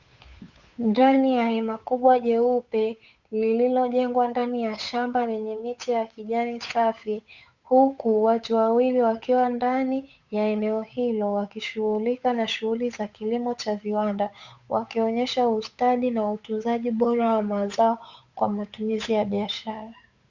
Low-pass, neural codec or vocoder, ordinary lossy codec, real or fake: 7.2 kHz; codec, 16 kHz, 8 kbps, FunCodec, trained on Chinese and English, 25 frames a second; Opus, 64 kbps; fake